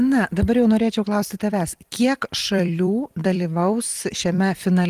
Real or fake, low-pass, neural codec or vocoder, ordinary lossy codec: fake; 14.4 kHz; vocoder, 44.1 kHz, 128 mel bands every 256 samples, BigVGAN v2; Opus, 24 kbps